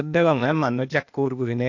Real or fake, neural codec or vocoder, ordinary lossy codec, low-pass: fake; codec, 16 kHz, 0.8 kbps, ZipCodec; AAC, 48 kbps; 7.2 kHz